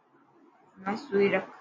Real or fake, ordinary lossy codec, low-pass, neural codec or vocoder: real; MP3, 32 kbps; 7.2 kHz; none